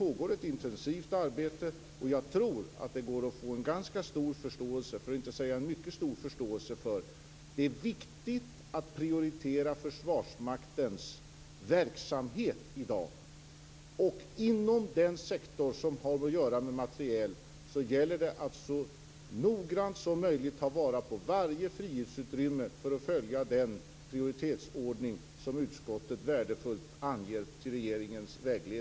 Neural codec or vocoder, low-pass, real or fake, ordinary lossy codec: none; none; real; none